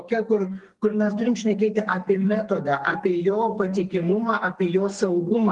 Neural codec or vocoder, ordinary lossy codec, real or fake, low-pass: codec, 32 kHz, 1.9 kbps, SNAC; Opus, 24 kbps; fake; 10.8 kHz